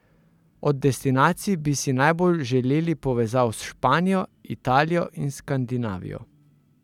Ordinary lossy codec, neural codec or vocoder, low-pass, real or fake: none; none; 19.8 kHz; real